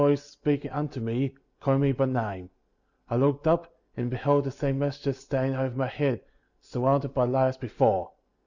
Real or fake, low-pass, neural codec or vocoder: real; 7.2 kHz; none